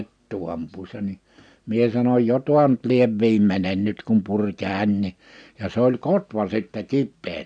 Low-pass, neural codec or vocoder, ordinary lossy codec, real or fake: 9.9 kHz; none; none; real